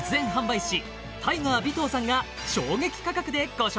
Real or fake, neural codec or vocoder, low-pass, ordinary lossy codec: real; none; none; none